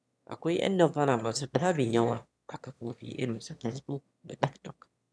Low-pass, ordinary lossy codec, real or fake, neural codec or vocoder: none; none; fake; autoencoder, 22.05 kHz, a latent of 192 numbers a frame, VITS, trained on one speaker